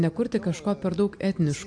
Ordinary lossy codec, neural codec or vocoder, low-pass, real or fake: MP3, 64 kbps; none; 9.9 kHz; real